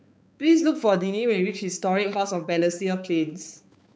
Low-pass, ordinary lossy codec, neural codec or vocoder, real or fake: none; none; codec, 16 kHz, 4 kbps, X-Codec, HuBERT features, trained on balanced general audio; fake